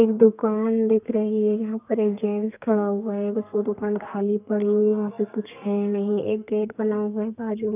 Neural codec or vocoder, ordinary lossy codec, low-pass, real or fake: codec, 32 kHz, 1.9 kbps, SNAC; none; 3.6 kHz; fake